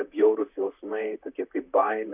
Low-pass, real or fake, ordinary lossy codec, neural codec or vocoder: 3.6 kHz; fake; Opus, 64 kbps; vocoder, 44.1 kHz, 128 mel bands, Pupu-Vocoder